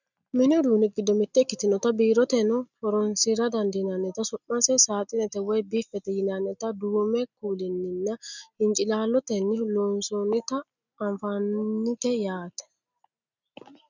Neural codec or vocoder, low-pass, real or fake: none; 7.2 kHz; real